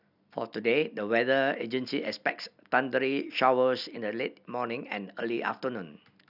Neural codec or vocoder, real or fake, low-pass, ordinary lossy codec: none; real; 5.4 kHz; none